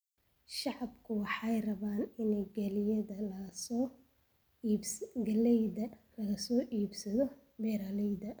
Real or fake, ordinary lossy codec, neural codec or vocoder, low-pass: real; none; none; none